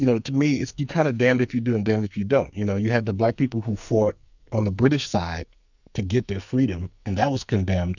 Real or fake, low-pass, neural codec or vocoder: fake; 7.2 kHz; codec, 44.1 kHz, 2.6 kbps, SNAC